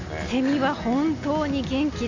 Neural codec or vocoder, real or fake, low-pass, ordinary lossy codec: none; real; 7.2 kHz; Opus, 64 kbps